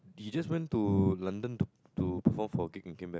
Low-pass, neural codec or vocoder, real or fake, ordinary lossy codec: none; none; real; none